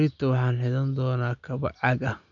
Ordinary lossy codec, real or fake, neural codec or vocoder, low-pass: none; real; none; 7.2 kHz